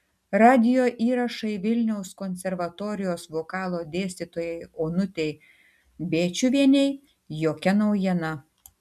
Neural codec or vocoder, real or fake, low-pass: none; real; 14.4 kHz